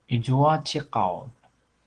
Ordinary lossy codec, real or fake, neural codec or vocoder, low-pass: Opus, 16 kbps; real; none; 9.9 kHz